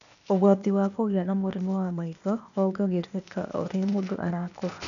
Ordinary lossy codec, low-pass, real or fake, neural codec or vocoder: none; 7.2 kHz; fake; codec, 16 kHz, 0.8 kbps, ZipCodec